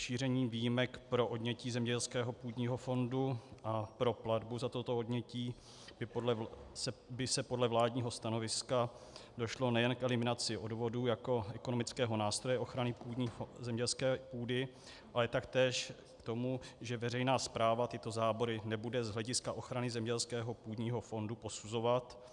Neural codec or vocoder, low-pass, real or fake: none; 10.8 kHz; real